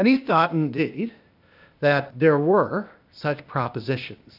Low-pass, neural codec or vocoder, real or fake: 5.4 kHz; codec, 16 kHz, 0.8 kbps, ZipCodec; fake